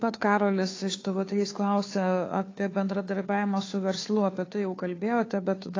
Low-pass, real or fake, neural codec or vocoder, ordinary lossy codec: 7.2 kHz; fake; codec, 16 kHz, 2 kbps, FunCodec, trained on Chinese and English, 25 frames a second; AAC, 32 kbps